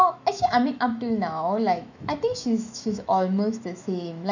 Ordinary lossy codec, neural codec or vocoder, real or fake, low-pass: none; autoencoder, 48 kHz, 128 numbers a frame, DAC-VAE, trained on Japanese speech; fake; 7.2 kHz